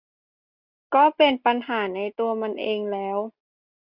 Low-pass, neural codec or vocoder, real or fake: 5.4 kHz; none; real